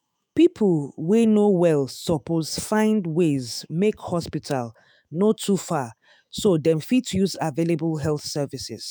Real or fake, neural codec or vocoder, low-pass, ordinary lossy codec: fake; autoencoder, 48 kHz, 128 numbers a frame, DAC-VAE, trained on Japanese speech; none; none